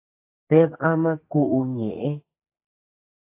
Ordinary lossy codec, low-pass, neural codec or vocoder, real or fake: AAC, 24 kbps; 3.6 kHz; codec, 44.1 kHz, 2.6 kbps, SNAC; fake